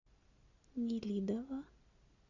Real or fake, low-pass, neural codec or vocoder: real; 7.2 kHz; none